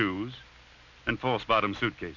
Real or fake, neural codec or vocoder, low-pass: real; none; 7.2 kHz